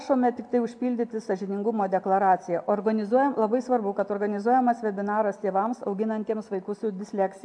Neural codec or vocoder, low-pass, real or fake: none; 9.9 kHz; real